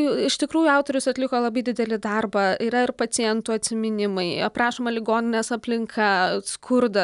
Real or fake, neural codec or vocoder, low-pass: real; none; 10.8 kHz